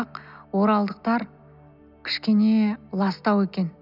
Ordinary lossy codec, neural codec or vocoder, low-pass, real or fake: none; none; 5.4 kHz; real